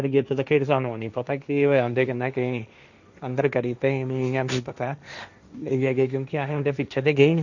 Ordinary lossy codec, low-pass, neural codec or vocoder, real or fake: none; 7.2 kHz; codec, 16 kHz, 1.1 kbps, Voila-Tokenizer; fake